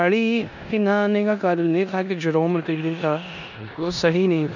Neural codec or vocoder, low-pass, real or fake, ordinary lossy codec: codec, 16 kHz in and 24 kHz out, 0.9 kbps, LongCat-Audio-Codec, four codebook decoder; 7.2 kHz; fake; none